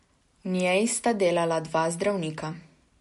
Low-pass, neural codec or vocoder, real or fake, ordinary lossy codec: 14.4 kHz; none; real; MP3, 48 kbps